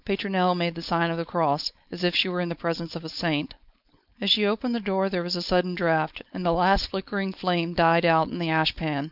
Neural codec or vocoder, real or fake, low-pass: codec, 16 kHz, 4.8 kbps, FACodec; fake; 5.4 kHz